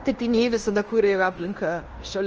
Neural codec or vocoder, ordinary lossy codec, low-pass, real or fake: codec, 16 kHz in and 24 kHz out, 0.9 kbps, LongCat-Audio-Codec, fine tuned four codebook decoder; Opus, 24 kbps; 7.2 kHz; fake